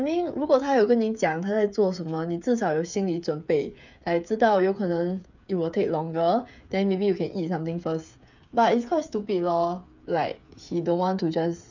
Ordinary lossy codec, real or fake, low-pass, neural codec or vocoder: none; fake; 7.2 kHz; codec, 16 kHz, 8 kbps, FreqCodec, smaller model